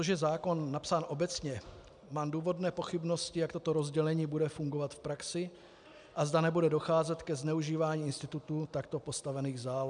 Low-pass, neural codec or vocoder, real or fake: 9.9 kHz; none; real